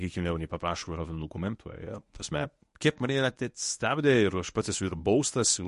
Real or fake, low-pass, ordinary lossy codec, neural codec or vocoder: fake; 10.8 kHz; MP3, 48 kbps; codec, 24 kHz, 0.9 kbps, WavTokenizer, medium speech release version 1